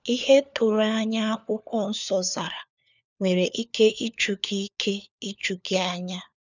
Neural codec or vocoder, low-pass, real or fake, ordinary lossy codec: codec, 16 kHz, 4 kbps, FunCodec, trained on LibriTTS, 50 frames a second; 7.2 kHz; fake; none